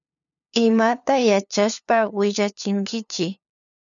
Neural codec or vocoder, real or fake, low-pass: codec, 16 kHz, 2 kbps, FunCodec, trained on LibriTTS, 25 frames a second; fake; 7.2 kHz